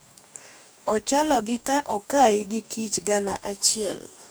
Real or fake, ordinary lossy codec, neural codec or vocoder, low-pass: fake; none; codec, 44.1 kHz, 2.6 kbps, DAC; none